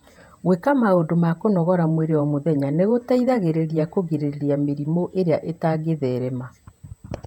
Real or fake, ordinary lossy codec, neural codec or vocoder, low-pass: fake; none; vocoder, 48 kHz, 128 mel bands, Vocos; 19.8 kHz